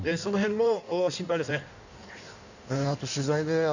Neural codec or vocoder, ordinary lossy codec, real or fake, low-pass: codec, 16 kHz in and 24 kHz out, 1.1 kbps, FireRedTTS-2 codec; none; fake; 7.2 kHz